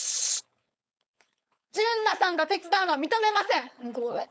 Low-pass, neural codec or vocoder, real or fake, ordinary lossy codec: none; codec, 16 kHz, 4.8 kbps, FACodec; fake; none